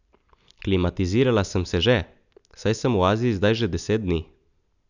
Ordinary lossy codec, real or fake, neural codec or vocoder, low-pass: none; real; none; 7.2 kHz